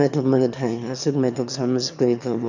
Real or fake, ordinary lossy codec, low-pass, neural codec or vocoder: fake; none; 7.2 kHz; autoencoder, 22.05 kHz, a latent of 192 numbers a frame, VITS, trained on one speaker